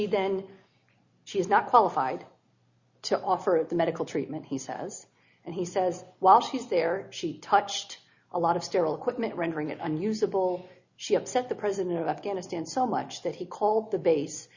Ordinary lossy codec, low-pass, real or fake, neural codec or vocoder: Opus, 64 kbps; 7.2 kHz; real; none